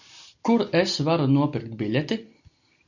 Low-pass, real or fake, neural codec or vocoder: 7.2 kHz; real; none